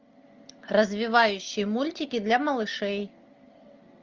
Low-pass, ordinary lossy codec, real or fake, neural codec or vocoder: 7.2 kHz; Opus, 24 kbps; real; none